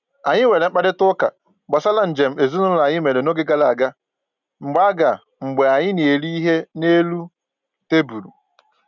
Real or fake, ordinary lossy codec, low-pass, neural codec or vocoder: real; none; 7.2 kHz; none